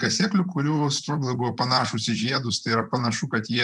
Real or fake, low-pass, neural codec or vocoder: fake; 10.8 kHz; vocoder, 44.1 kHz, 128 mel bands, Pupu-Vocoder